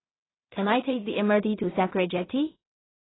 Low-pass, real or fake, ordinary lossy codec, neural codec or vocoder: 7.2 kHz; fake; AAC, 16 kbps; codec, 16 kHz in and 24 kHz out, 0.4 kbps, LongCat-Audio-Codec, two codebook decoder